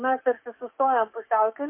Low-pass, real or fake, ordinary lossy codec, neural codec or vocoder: 3.6 kHz; fake; MP3, 24 kbps; codec, 44.1 kHz, 7.8 kbps, DAC